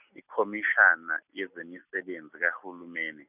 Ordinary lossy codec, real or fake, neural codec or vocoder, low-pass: none; real; none; 3.6 kHz